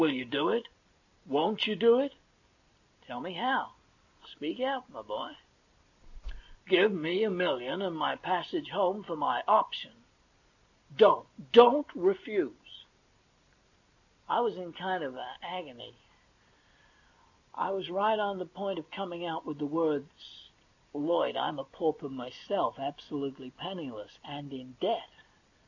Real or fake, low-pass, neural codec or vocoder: real; 7.2 kHz; none